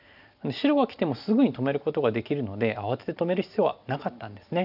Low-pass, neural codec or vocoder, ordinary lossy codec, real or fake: 5.4 kHz; none; none; real